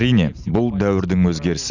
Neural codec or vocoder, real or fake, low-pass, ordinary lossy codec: none; real; 7.2 kHz; none